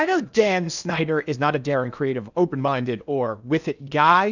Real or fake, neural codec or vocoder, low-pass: fake; codec, 16 kHz in and 24 kHz out, 0.8 kbps, FocalCodec, streaming, 65536 codes; 7.2 kHz